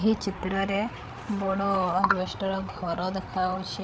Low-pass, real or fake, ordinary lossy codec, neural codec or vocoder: none; fake; none; codec, 16 kHz, 8 kbps, FreqCodec, larger model